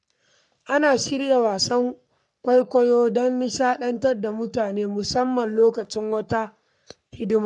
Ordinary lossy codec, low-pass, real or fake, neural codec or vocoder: none; 10.8 kHz; fake; codec, 44.1 kHz, 3.4 kbps, Pupu-Codec